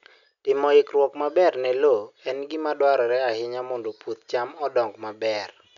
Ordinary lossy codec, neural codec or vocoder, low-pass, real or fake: none; none; 7.2 kHz; real